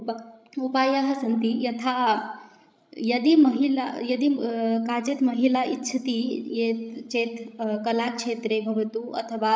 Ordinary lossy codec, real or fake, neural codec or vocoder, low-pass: none; fake; codec, 16 kHz, 16 kbps, FreqCodec, larger model; none